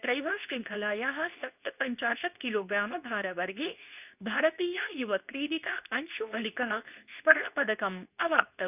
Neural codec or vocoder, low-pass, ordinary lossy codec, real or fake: codec, 24 kHz, 0.9 kbps, WavTokenizer, medium speech release version 2; 3.6 kHz; none; fake